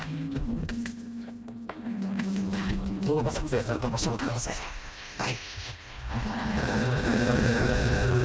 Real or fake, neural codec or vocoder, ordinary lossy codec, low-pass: fake; codec, 16 kHz, 1 kbps, FreqCodec, smaller model; none; none